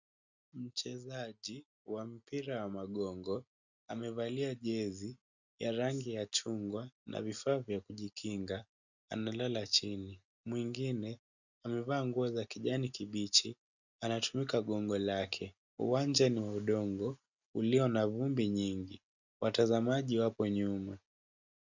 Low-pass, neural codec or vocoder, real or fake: 7.2 kHz; none; real